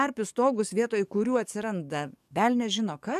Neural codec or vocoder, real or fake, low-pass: codec, 44.1 kHz, 7.8 kbps, DAC; fake; 14.4 kHz